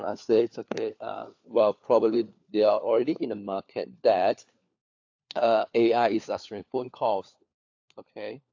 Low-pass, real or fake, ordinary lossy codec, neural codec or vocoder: 7.2 kHz; fake; AAC, 48 kbps; codec, 16 kHz, 4 kbps, FunCodec, trained on LibriTTS, 50 frames a second